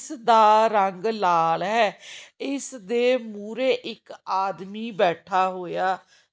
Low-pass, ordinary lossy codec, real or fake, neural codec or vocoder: none; none; real; none